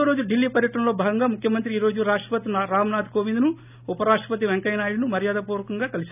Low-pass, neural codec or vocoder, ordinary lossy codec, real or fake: 3.6 kHz; none; none; real